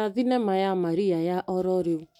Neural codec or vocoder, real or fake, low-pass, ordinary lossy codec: none; real; 19.8 kHz; none